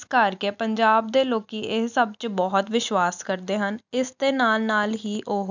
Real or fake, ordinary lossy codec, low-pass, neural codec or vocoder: real; none; 7.2 kHz; none